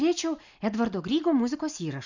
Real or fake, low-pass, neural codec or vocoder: real; 7.2 kHz; none